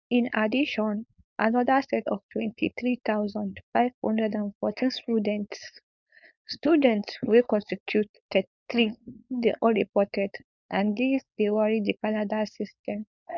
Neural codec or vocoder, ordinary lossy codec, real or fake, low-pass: codec, 16 kHz, 4.8 kbps, FACodec; none; fake; 7.2 kHz